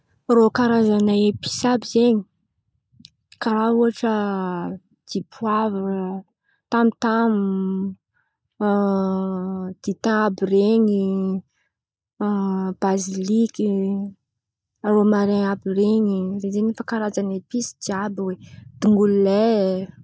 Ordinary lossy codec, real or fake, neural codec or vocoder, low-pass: none; real; none; none